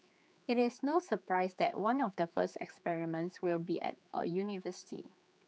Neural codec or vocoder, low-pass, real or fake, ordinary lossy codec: codec, 16 kHz, 4 kbps, X-Codec, HuBERT features, trained on general audio; none; fake; none